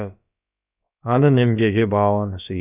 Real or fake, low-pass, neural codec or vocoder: fake; 3.6 kHz; codec, 16 kHz, about 1 kbps, DyCAST, with the encoder's durations